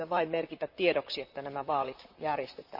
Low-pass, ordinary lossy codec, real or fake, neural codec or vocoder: 5.4 kHz; none; fake; vocoder, 44.1 kHz, 128 mel bands, Pupu-Vocoder